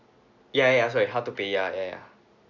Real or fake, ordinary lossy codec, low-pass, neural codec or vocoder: real; none; 7.2 kHz; none